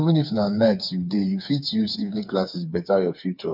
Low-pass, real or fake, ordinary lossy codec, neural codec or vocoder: 5.4 kHz; fake; none; codec, 16 kHz, 4 kbps, FreqCodec, smaller model